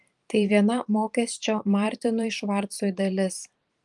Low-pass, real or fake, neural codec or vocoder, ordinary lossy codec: 10.8 kHz; fake; vocoder, 44.1 kHz, 128 mel bands every 512 samples, BigVGAN v2; Opus, 32 kbps